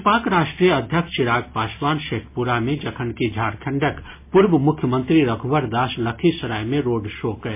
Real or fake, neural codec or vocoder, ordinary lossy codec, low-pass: real; none; MP3, 24 kbps; 3.6 kHz